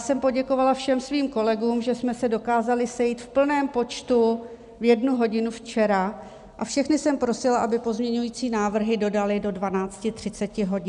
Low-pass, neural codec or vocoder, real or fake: 10.8 kHz; none; real